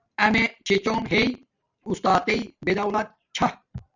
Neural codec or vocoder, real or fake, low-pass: vocoder, 44.1 kHz, 128 mel bands every 512 samples, BigVGAN v2; fake; 7.2 kHz